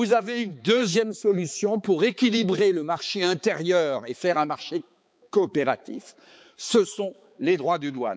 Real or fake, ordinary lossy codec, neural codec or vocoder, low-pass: fake; none; codec, 16 kHz, 4 kbps, X-Codec, HuBERT features, trained on balanced general audio; none